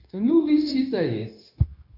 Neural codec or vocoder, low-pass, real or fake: codec, 16 kHz, 0.9 kbps, LongCat-Audio-Codec; 5.4 kHz; fake